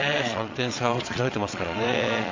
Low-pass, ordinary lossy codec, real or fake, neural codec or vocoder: 7.2 kHz; MP3, 64 kbps; fake; vocoder, 22.05 kHz, 80 mel bands, Vocos